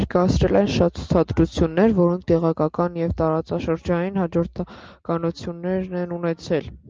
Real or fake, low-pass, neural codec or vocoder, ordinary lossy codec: real; 7.2 kHz; none; Opus, 24 kbps